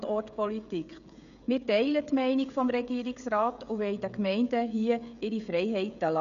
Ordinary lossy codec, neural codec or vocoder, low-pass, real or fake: none; codec, 16 kHz, 16 kbps, FreqCodec, smaller model; 7.2 kHz; fake